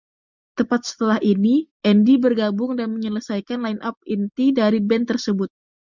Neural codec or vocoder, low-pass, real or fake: none; 7.2 kHz; real